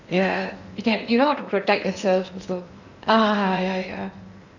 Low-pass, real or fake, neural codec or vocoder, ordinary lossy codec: 7.2 kHz; fake; codec, 16 kHz in and 24 kHz out, 0.8 kbps, FocalCodec, streaming, 65536 codes; none